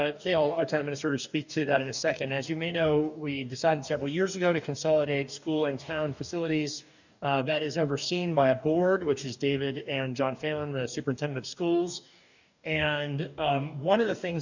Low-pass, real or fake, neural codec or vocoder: 7.2 kHz; fake; codec, 44.1 kHz, 2.6 kbps, DAC